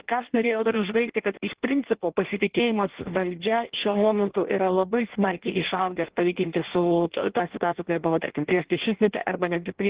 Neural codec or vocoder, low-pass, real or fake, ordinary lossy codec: codec, 16 kHz in and 24 kHz out, 0.6 kbps, FireRedTTS-2 codec; 3.6 kHz; fake; Opus, 16 kbps